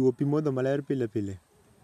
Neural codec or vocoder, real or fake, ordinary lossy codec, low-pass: none; real; none; 14.4 kHz